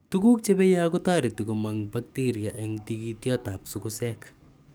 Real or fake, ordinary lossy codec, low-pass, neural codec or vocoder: fake; none; none; codec, 44.1 kHz, 7.8 kbps, DAC